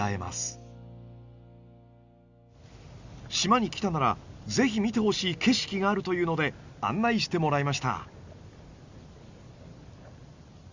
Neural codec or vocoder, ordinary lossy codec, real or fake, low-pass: none; Opus, 64 kbps; real; 7.2 kHz